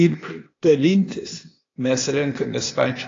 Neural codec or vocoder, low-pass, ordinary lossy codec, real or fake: codec, 16 kHz, 0.8 kbps, ZipCodec; 7.2 kHz; AAC, 32 kbps; fake